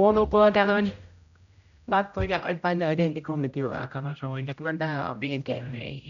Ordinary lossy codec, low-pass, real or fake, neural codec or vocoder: none; 7.2 kHz; fake; codec, 16 kHz, 0.5 kbps, X-Codec, HuBERT features, trained on general audio